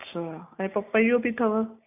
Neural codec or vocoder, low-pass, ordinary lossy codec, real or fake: none; 3.6 kHz; none; real